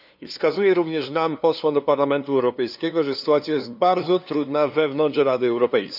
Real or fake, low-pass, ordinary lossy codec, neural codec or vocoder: fake; 5.4 kHz; none; codec, 16 kHz, 2 kbps, FunCodec, trained on LibriTTS, 25 frames a second